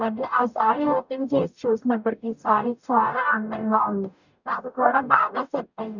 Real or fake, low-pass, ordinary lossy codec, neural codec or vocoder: fake; 7.2 kHz; none; codec, 44.1 kHz, 0.9 kbps, DAC